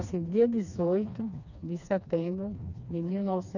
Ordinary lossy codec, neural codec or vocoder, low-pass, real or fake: none; codec, 16 kHz, 2 kbps, FreqCodec, smaller model; 7.2 kHz; fake